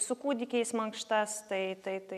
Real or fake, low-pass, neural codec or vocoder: real; 14.4 kHz; none